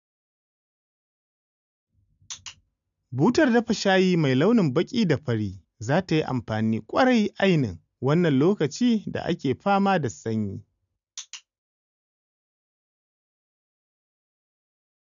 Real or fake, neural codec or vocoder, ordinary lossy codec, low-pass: real; none; none; 7.2 kHz